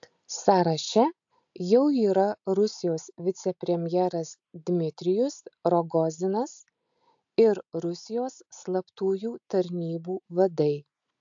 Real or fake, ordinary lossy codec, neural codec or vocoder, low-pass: real; AAC, 64 kbps; none; 7.2 kHz